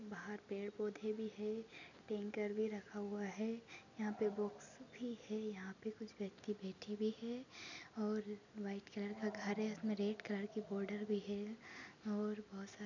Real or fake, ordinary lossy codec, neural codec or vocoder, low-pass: real; none; none; 7.2 kHz